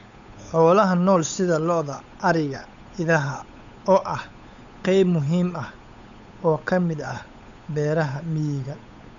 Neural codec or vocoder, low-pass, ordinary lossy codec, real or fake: codec, 16 kHz, 8 kbps, FunCodec, trained on Chinese and English, 25 frames a second; 7.2 kHz; none; fake